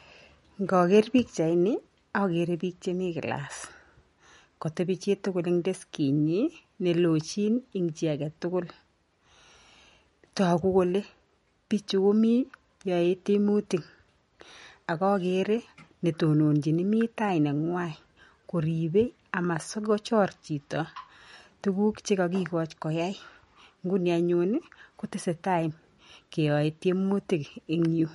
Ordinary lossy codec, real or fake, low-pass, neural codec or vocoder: MP3, 48 kbps; real; 19.8 kHz; none